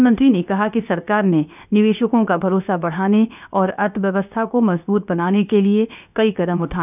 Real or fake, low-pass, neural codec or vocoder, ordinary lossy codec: fake; 3.6 kHz; codec, 16 kHz, 0.7 kbps, FocalCodec; none